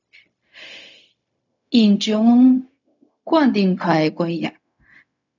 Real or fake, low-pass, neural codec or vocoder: fake; 7.2 kHz; codec, 16 kHz, 0.4 kbps, LongCat-Audio-Codec